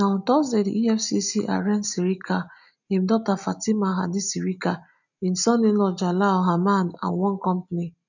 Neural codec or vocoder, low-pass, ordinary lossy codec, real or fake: none; 7.2 kHz; none; real